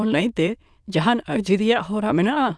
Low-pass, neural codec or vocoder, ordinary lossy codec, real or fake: none; autoencoder, 22.05 kHz, a latent of 192 numbers a frame, VITS, trained on many speakers; none; fake